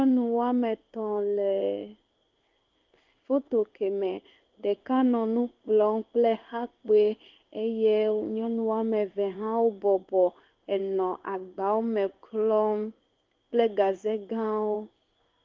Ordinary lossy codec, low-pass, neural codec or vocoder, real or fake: Opus, 24 kbps; 7.2 kHz; codec, 16 kHz in and 24 kHz out, 1 kbps, XY-Tokenizer; fake